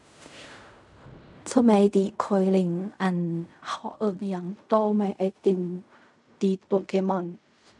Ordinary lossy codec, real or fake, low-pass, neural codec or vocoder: none; fake; 10.8 kHz; codec, 16 kHz in and 24 kHz out, 0.4 kbps, LongCat-Audio-Codec, fine tuned four codebook decoder